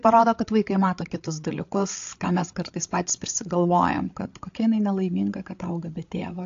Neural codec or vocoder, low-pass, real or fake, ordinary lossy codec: codec, 16 kHz, 8 kbps, FreqCodec, larger model; 7.2 kHz; fake; AAC, 48 kbps